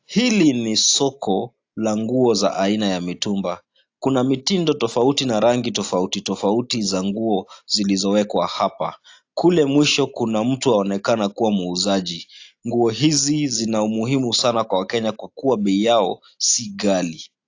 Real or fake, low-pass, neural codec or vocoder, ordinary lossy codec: real; 7.2 kHz; none; AAC, 48 kbps